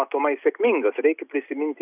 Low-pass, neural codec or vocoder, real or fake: 3.6 kHz; none; real